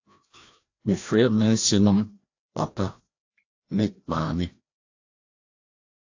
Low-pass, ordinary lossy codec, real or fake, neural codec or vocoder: 7.2 kHz; AAC, 48 kbps; fake; codec, 16 kHz, 1 kbps, FreqCodec, larger model